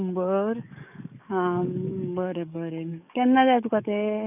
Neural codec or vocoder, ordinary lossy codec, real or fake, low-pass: codec, 44.1 kHz, 7.8 kbps, DAC; none; fake; 3.6 kHz